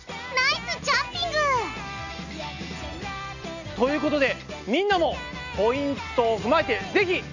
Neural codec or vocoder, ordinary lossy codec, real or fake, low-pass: none; none; real; 7.2 kHz